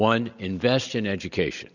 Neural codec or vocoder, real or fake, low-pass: none; real; 7.2 kHz